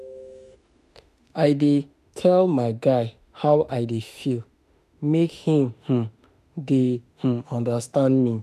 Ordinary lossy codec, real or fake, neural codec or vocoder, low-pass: none; fake; autoencoder, 48 kHz, 32 numbers a frame, DAC-VAE, trained on Japanese speech; 14.4 kHz